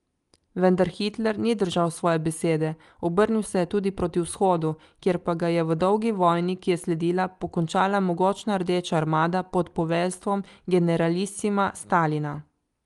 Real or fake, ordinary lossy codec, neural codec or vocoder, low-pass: real; Opus, 32 kbps; none; 10.8 kHz